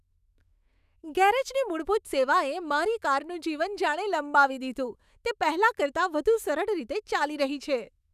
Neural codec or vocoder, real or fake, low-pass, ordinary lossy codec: autoencoder, 48 kHz, 128 numbers a frame, DAC-VAE, trained on Japanese speech; fake; 14.4 kHz; none